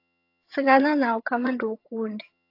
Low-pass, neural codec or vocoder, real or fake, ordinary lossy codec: 5.4 kHz; vocoder, 22.05 kHz, 80 mel bands, HiFi-GAN; fake; AAC, 32 kbps